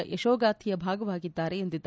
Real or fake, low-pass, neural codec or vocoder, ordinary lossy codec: real; none; none; none